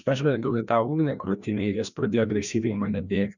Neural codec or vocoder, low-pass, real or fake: codec, 16 kHz, 1 kbps, FreqCodec, larger model; 7.2 kHz; fake